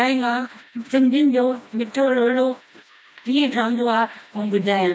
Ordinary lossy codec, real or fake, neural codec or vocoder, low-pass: none; fake; codec, 16 kHz, 1 kbps, FreqCodec, smaller model; none